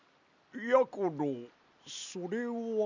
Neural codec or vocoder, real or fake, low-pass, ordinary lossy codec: none; real; 7.2 kHz; none